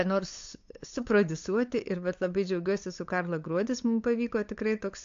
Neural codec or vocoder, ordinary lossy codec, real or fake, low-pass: codec, 16 kHz, 8 kbps, FunCodec, trained on LibriTTS, 25 frames a second; AAC, 48 kbps; fake; 7.2 kHz